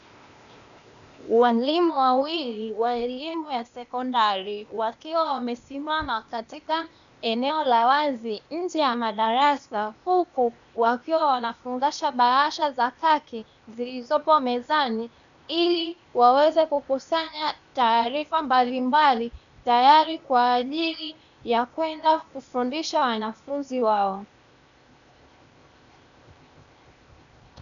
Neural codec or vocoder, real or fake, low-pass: codec, 16 kHz, 0.8 kbps, ZipCodec; fake; 7.2 kHz